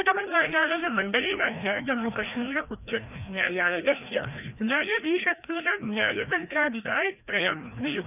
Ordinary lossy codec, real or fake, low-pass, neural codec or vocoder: none; fake; 3.6 kHz; codec, 16 kHz, 1 kbps, FreqCodec, larger model